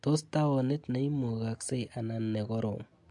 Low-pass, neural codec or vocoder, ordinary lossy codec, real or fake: 10.8 kHz; none; MP3, 64 kbps; real